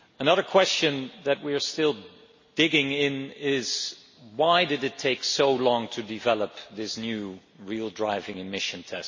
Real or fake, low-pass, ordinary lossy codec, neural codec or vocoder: real; 7.2 kHz; MP3, 32 kbps; none